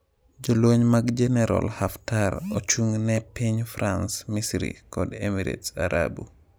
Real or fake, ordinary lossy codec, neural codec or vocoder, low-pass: real; none; none; none